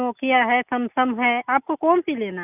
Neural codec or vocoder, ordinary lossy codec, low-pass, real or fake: none; none; 3.6 kHz; real